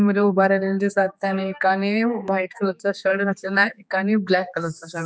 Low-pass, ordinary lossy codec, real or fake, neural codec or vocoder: none; none; fake; codec, 16 kHz, 4 kbps, X-Codec, HuBERT features, trained on general audio